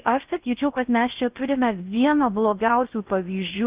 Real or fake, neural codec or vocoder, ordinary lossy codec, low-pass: fake; codec, 16 kHz in and 24 kHz out, 0.6 kbps, FocalCodec, streaming, 4096 codes; Opus, 24 kbps; 3.6 kHz